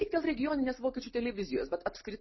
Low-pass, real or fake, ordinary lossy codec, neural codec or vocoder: 7.2 kHz; real; MP3, 24 kbps; none